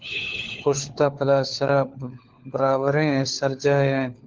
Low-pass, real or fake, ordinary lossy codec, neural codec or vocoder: 7.2 kHz; fake; Opus, 16 kbps; codec, 16 kHz, 4 kbps, FunCodec, trained on LibriTTS, 50 frames a second